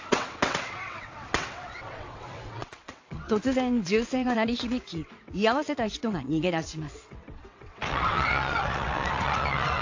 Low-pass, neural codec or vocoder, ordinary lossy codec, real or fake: 7.2 kHz; vocoder, 22.05 kHz, 80 mel bands, WaveNeXt; AAC, 48 kbps; fake